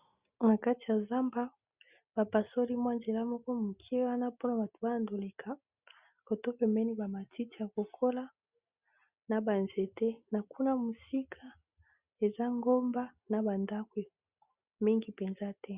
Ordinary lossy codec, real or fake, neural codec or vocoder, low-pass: Opus, 64 kbps; real; none; 3.6 kHz